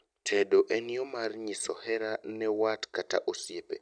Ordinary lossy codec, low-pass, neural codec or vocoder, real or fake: none; 9.9 kHz; none; real